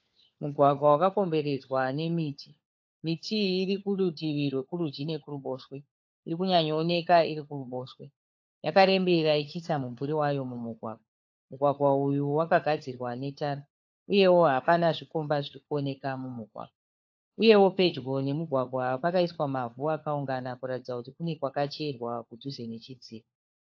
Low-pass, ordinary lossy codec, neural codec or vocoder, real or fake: 7.2 kHz; AAC, 48 kbps; codec, 16 kHz, 4 kbps, FunCodec, trained on LibriTTS, 50 frames a second; fake